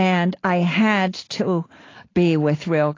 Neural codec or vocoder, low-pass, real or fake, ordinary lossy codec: none; 7.2 kHz; real; AAC, 32 kbps